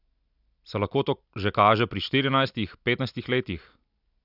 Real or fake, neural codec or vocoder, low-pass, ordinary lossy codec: real; none; 5.4 kHz; none